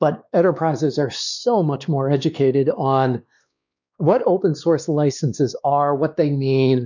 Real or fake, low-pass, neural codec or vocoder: fake; 7.2 kHz; codec, 16 kHz, 2 kbps, X-Codec, WavLM features, trained on Multilingual LibriSpeech